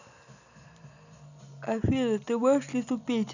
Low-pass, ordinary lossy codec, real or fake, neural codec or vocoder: 7.2 kHz; none; real; none